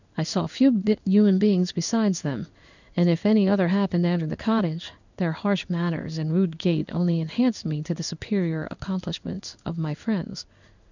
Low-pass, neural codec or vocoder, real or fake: 7.2 kHz; codec, 16 kHz in and 24 kHz out, 1 kbps, XY-Tokenizer; fake